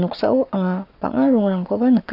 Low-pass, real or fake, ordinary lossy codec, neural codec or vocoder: 5.4 kHz; fake; AAC, 48 kbps; codec, 44.1 kHz, 7.8 kbps, Pupu-Codec